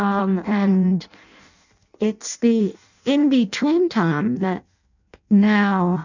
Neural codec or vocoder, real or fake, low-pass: codec, 16 kHz in and 24 kHz out, 0.6 kbps, FireRedTTS-2 codec; fake; 7.2 kHz